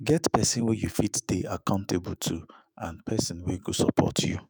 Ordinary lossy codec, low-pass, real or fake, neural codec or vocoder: none; none; fake; autoencoder, 48 kHz, 128 numbers a frame, DAC-VAE, trained on Japanese speech